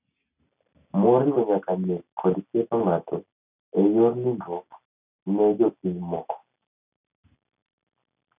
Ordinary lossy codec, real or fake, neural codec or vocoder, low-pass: none; real; none; 3.6 kHz